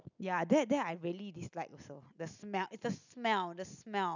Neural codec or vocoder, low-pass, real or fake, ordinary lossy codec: none; 7.2 kHz; real; none